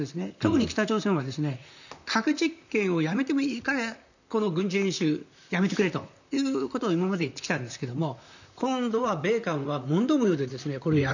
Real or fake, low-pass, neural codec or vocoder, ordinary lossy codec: fake; 7.2 kHz; vocoder, 44.1 kHz, 128 mel bands, Pupu-Vocoder; none